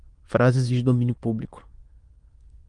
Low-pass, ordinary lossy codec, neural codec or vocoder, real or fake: 9.9 kHz; Opus, 24 kbps; autoencoder, 22.05 kHz, a latent of 192 numbers a frame, VITS, trained on many speakers; fake